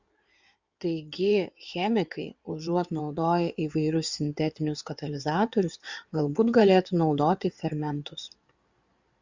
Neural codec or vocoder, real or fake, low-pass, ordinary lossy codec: codec, 16 kHz in and 24 kHz out, 2.2 kbps, FireRedTTS-2 codec; fake; 7.2 kHz; Opus, 64 kbps